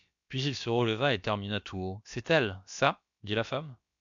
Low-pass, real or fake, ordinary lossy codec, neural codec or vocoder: 7.2 kHz; fake; MP3, 64 kbps; codec, 16 kHz, about 1 kbps, DyCAST, with the encoder's durations